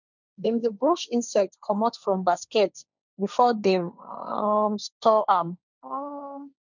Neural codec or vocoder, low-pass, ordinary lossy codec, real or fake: codec, 16 kHz, 1.1 kbps, Voila-Tokenizer; 7.2 kHz; none; fake